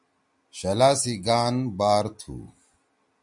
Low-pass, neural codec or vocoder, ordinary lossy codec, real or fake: 10.8 kHz; none; MP3, 64 kbps; real